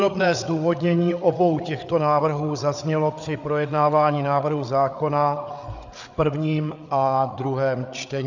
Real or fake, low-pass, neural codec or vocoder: fake; 7.2 kHz; codec, 16 kHz, 8 kbps, FreqCodec, larger model